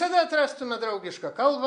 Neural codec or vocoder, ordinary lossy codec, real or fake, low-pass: none; MP3, 64 kbps; real; 9.9 kHz